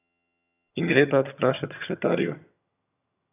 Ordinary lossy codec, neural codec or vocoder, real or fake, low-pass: none; vocoder, 22.05 kHz, 80 mel bands, HiFi-GAN; fake; 3.6 kHz